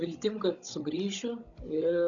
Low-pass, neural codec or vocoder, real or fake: 7.2 kHz; codec, 16 kHz, 16 kbps, FunCodec, trained on Chinese and English, 50 frames a second; fake